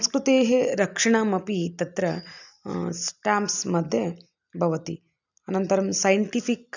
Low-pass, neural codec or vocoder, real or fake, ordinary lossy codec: 7.2 kHz; none; real; none